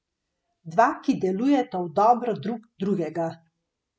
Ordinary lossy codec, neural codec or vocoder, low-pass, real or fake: none; none; none; real